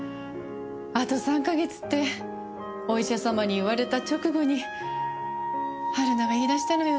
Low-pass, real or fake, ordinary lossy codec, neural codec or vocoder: none; real; none; none